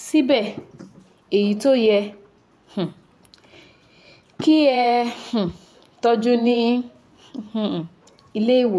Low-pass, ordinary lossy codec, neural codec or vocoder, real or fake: none; none; vocoder, 24 kHz, 100 mel bands, Vocos; fake